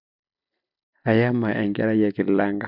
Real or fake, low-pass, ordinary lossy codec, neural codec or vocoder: real; 5.4 kHz; none; none